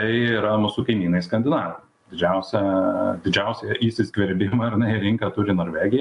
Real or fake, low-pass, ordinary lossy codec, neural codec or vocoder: real; 10.8 kHz; AAC, 64 kbps; none